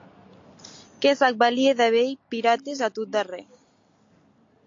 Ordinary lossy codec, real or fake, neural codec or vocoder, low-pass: AAC, 48 kbps; real; none; 7.2 kHz